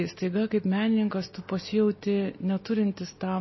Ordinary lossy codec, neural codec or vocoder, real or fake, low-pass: MP3, 24 kbps; none; real; 7.2 kHz